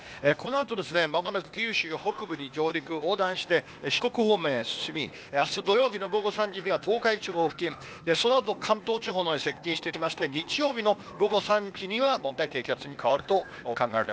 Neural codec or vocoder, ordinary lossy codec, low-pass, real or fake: codec, 16 kHz, 0.8 kbps, ZipCodec; none; none; fake